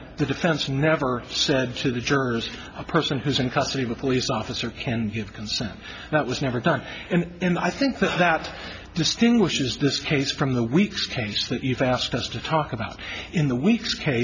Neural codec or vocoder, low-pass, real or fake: none; 7.2 kHz; real